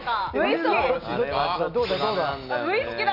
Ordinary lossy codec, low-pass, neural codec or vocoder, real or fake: none; 5.4 kHz; none; real